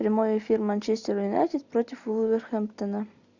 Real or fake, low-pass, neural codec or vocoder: real; 7.2 kHz; none